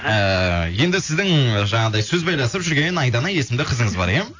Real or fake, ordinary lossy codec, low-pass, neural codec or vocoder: real; AAC, 48 kbps; 7.2 kHz; none